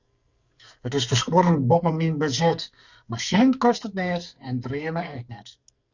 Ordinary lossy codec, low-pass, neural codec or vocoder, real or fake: Opus, 64 kbps; 7.2 kHz; codec, 44.1 kHz, 2.6 kbps, SNAC; fake